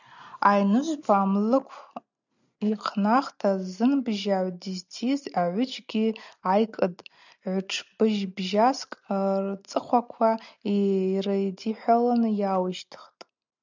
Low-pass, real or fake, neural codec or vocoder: 7.2 kHz; real; none